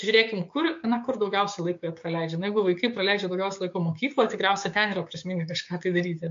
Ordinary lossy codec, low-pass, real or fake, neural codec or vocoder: MP3, 48 kbps; 7.2 kHz; fake; codec, 16 kHz, 6 kbps, DAC